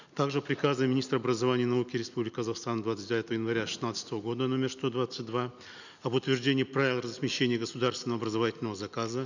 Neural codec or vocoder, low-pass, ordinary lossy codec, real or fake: none; 7.2 kHz; none; real